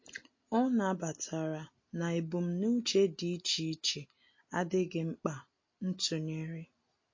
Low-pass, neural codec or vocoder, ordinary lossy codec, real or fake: 7.2 kHz; none; MP3, 32 kbps; real